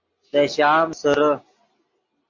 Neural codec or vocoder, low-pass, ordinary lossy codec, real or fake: none; 7.2 kHz; MP3, 48 kbps; real